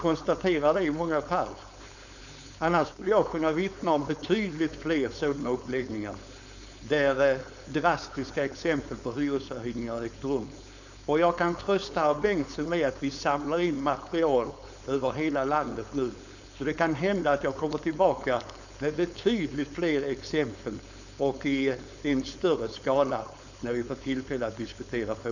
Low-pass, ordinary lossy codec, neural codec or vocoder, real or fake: 7.2 kHz; none; codec, 16 kHz, 4.8 kbps, FACodec; fake